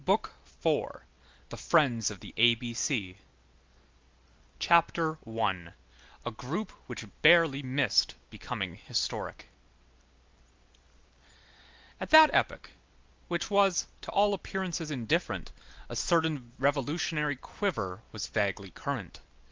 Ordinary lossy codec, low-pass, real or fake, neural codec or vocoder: Opus, 32 kbps; 7.2 kHz; real; none